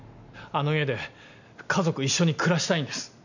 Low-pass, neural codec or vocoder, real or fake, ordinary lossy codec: 7.2 kHz; none; real; none